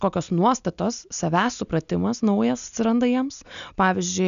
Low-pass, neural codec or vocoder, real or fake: 7.2 kHz; none; real